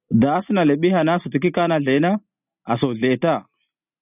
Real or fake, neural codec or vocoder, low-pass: real; none; 3.6 kHz